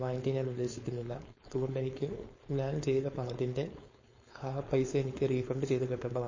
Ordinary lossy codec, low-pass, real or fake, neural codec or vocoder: MP3, 32 kbps; 7.2 kHz; fake; codec, 16 kHz, 4.8 kbps, FACodec